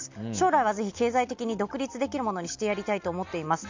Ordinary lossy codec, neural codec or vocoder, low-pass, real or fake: MP3, 64 kbps; none; 7.2 kHz; real